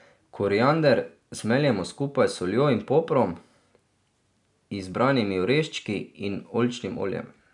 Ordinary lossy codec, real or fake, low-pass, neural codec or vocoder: none; real; 10.8 kHz; none